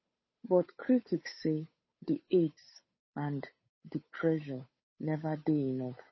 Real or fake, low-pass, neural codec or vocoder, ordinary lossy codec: fake; 7.2 kHz; codec, 16 kHz, 8 kbps, FunCodec, trained on Chinese and English, 25 frames a second; MP3, 24 kbps